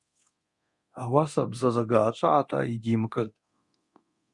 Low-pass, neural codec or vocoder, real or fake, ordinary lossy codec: 10.8 kHz; codec, 24 kHz, 0.9 kbps, DualCodec; fake; Opus, 64 kbps